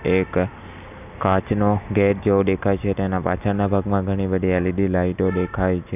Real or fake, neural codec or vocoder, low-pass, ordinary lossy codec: real; none; 3.6 kHz; none